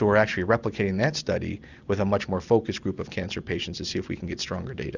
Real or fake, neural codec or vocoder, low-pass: real; none; 7.2 kHz